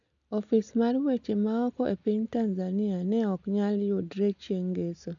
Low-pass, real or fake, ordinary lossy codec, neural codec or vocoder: 7.2 kHz; real; none; none